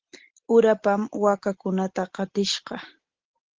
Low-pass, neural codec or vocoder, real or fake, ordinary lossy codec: 7.2 kHz; none; real; Opus, 16 kbps